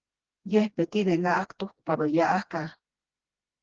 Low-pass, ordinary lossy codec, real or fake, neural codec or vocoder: 7.2 kHz; Opus, 16 kbps; fake; codec, 16 kHz, 1 kbps, FreqCodec, smaller model